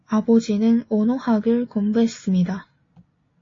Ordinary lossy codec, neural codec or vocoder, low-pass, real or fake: AAC, 32 kbps; none; 7.2 kHz; real